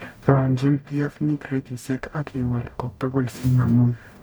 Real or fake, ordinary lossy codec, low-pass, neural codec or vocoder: fake; none; none; codec, 44.1 kHz, 0.9 kbps, DAC